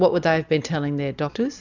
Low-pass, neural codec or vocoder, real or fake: 7.2 kHz; none; real